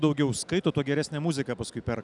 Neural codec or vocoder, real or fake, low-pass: none; real; 10.8 kHz